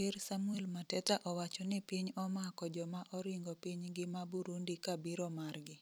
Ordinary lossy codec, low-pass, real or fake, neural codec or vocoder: none; none; fake; vocoder, 44.1 kHz, 128 mel bands every 256 samples, BigVGAN v2